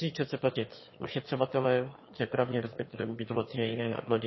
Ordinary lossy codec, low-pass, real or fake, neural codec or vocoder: MP3, 24 kbps; 7.2 kHz; fake; autoencoder, 22.05 kHz, a latent of 192 numbers a frame, VITS, trained on one speaker